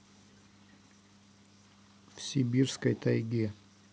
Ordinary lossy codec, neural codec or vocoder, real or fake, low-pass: none; none; real; none